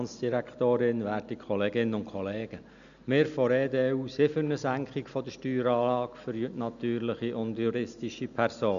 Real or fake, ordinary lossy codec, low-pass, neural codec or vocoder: real; none; 7.2 kHz; none